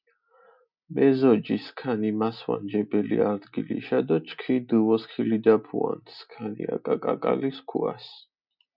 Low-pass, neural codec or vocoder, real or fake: 5.4 kHz; none; real